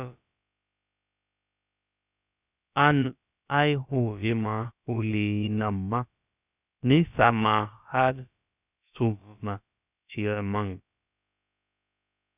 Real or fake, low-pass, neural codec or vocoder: fake; 3.6 kHz; codec, 16 kHz, about 1 kbps, DyCAST, with the encoder's durations